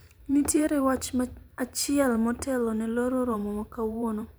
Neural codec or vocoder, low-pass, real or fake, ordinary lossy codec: vocoder, 44.1 kHz, 128 mel bands every 512 samples, BigVGAN v2; none; fake; none